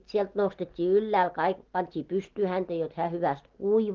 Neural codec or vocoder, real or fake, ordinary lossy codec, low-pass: none; real; Opus, 16 kbps; 7.2 kHz